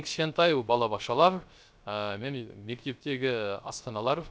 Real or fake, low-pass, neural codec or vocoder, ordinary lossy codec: fake; none; codec, 16 kHz, 0.3 kbps, FocalCodec; none